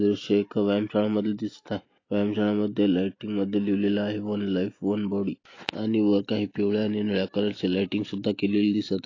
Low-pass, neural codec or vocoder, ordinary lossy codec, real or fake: 7.2 kHz; none; AAC, 32 kbps; real